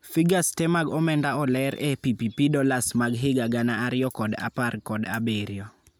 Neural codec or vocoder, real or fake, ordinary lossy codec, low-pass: none; real; none; none